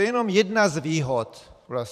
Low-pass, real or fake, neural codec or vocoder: 14.4 kHz; fake; vocoder, 44.1 kHz, 128 mel bands every 512 samples, BigVGAN v2